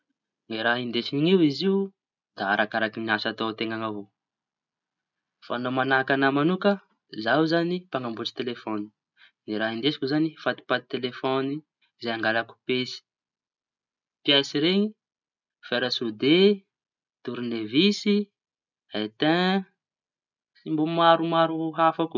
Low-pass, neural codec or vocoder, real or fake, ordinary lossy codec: 7.2 kHz; none; real; none